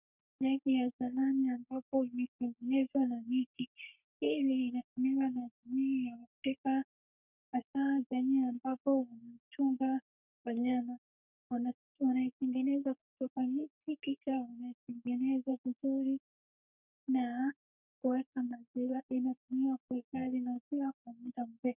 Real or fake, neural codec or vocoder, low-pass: fake; codec, 44.1 kHz, 3.4 kbps, Pupu-Codec; 3.6 kHz